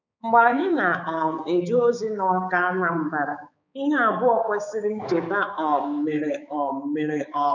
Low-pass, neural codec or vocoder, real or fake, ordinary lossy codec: 7.2 kHz; codec, 16 kHz, 4 kbps, X-Codec, HuBERT features, trained on balanced general audio; fake; none